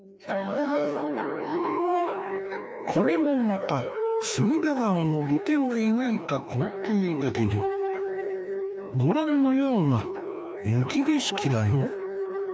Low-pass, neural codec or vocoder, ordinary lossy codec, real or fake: none; codec, 16 kHz, 1 kbps, FreqCodec, larger model; none; fake